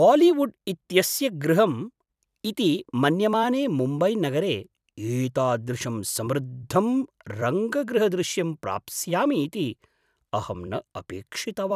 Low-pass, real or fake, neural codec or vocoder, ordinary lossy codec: 14.4 kHz; fake; vocoder, 44.1 kHz, 128 mel bands every 256 samples, BigVGAN v2; none